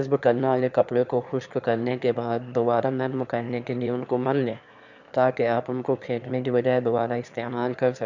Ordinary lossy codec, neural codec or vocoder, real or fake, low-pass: none; autoencoder, 22.05 kHz, a latent of 192 numbers a frame, VITS, trained on one speaker; fake; 7.2 kHz